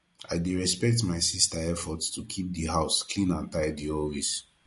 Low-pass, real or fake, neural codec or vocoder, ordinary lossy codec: 14.4 kHz; real; none; MP3, 48 kbps